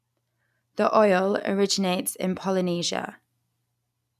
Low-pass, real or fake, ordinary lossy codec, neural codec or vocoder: 14.4 kHz; real; none; none